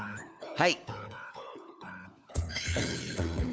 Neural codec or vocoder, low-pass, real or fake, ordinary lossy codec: codec, 16 kHz, 4 kbps, FunCodec, trained on LibriTTS, 50 frames a second; none; fake; none